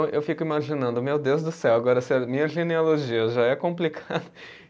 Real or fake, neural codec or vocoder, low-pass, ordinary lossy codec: real; none; none; none